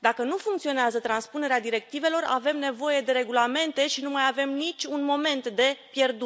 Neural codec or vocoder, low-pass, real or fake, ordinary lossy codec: none; none; real; none